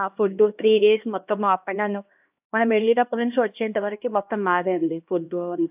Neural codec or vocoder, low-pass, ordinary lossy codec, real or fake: codec, 16 kHz, 1 kbps, X-Codec, HuBERT features, trained on LibriSpeech; 3.6 kHz; AAC, 32 kbps; fake